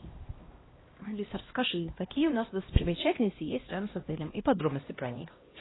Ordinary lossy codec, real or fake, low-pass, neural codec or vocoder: AAC, 16 kbps; fake; 7.2 kHz; codec, 16 kHz, 1 kbps, X-Codec, HuBERT features, trained on LibriSpeech